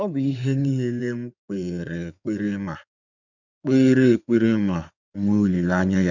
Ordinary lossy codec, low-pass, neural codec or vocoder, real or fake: none; 7.2 kHz; codec, 16 kHz in and 24 kHz out, 2.2 kbps, FireRedTTS-2 codec; fake